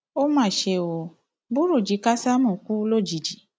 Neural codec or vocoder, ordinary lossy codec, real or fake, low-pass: none; none; real; none